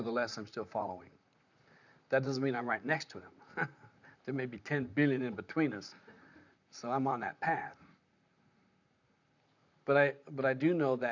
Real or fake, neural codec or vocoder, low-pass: fake; vocoder, 44.1 kHz, 128 mel bands, Pupu-Vocoder; 7.2 kHz